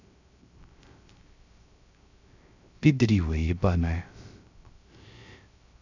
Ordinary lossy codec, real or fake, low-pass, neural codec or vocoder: none; fake; 7.2 kHz; codec, 16 kHz, 0.3 kbps, FocalCodec